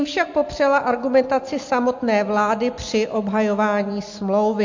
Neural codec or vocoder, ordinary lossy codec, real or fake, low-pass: none; MP3, 48 kbps; real; 7.2 kHz